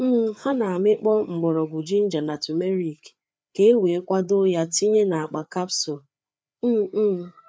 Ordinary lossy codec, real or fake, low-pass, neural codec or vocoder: none; fake; none; codec, 16 kHz, 4 kbps, FreqCodec, larger model